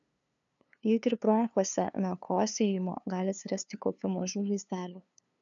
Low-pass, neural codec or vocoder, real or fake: 7.2 kHz; codec, 16 kHz, 2 kbps, FunCodec, trained on LibriTTS, 25 frames a second; fake